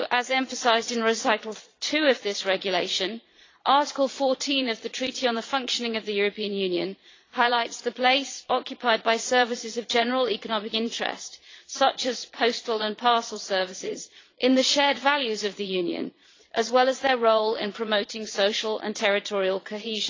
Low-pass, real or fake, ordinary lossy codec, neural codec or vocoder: 7.2 kHz; fake; AAC, 32 kbps; vocoder, 22.05 kHz, 80 mel bands, Vocos